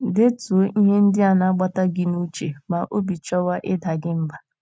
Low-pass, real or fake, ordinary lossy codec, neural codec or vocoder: none; real; none; none